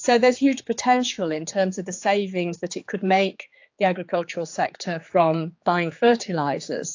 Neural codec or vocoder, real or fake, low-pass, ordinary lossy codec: codec, 16 kHz, 4 kbps, X-Codec, HuBERT features, trained on general audio; fake; 7.2 kHz; AAC, 48 kbps